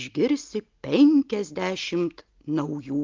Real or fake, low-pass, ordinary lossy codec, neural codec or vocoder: real; 7.2 kHz; Opus, 32 kbps; none